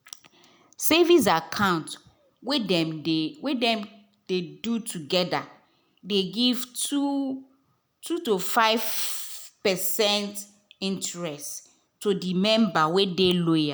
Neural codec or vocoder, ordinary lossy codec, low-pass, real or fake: none; none; none; real